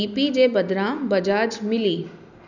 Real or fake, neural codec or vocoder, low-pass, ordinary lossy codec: real; none; 7.2 kHz; none